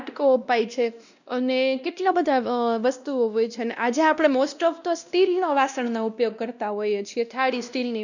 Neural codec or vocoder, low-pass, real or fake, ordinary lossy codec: codec, 16 kHz, 1 kbps, X-Codec, WavLM features, trained on Multilingual LibriSpeech; 7.2 kHz; fake; none